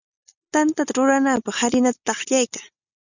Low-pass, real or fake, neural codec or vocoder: 7.2 kHz; real; none